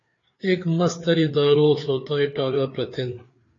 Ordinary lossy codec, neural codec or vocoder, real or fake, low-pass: AAC, 32 kbps; codec, 16 kHz, 4 kbps, FreqCodec, larger model; fake; 7.2 kHz